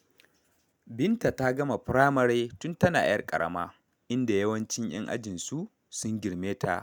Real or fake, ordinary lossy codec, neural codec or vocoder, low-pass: real; none; none; none